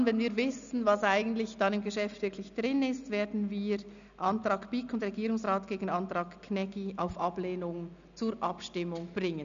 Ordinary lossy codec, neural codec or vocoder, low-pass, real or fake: none; none; 7.2 kHz; real